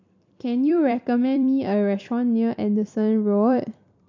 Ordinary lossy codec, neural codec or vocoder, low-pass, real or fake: MP3, 48 kbps; vocoder, 44.1 kHz, 128 mel bands every 256 samples, BigVGAN v2; 7.2 kHz; fake